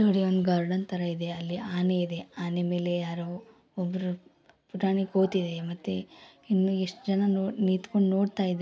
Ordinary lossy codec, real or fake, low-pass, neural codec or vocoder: none; real; none; none